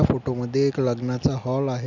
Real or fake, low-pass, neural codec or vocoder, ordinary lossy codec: real; 7.2 kHz; none; none